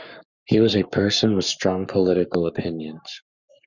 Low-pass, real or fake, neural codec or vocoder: 7.2 kHz; fake; codec, 44.1 kHz, 7.8 kbps, Pupu-Codec